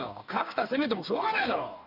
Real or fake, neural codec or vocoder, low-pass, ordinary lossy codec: fake; codec, 44.1 kHz, 2.6 kbps, DAC; 5.4 kHz; none